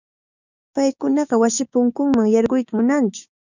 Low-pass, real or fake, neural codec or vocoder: 7.2 kHz; fake; codec, 16 kHz, 6 kbps, DAC